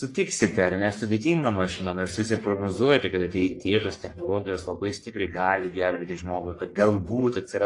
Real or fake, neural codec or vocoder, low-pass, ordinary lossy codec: fake; codec, 44.1 kHz, 1.7 kbps, Pupu-Codec; 10.8 kHz; AAC, 48 kbps